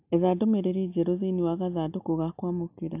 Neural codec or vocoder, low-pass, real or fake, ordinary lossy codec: none; 3.6 kHz; real; AAC, 32 kbps